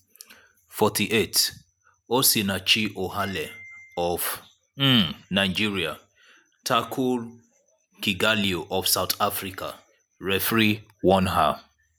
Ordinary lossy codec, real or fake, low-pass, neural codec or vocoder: none; real; none; none